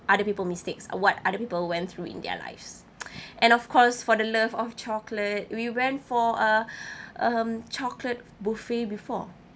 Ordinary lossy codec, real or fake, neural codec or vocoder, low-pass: none; real; none; none